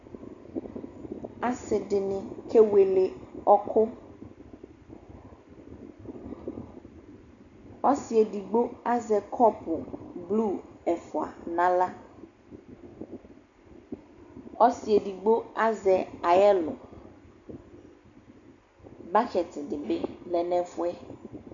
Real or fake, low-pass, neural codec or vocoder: real; 7.2 kHz; none